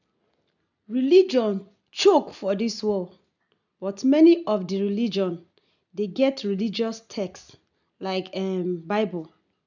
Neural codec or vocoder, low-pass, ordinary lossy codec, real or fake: none; 7.2 kHz; none; real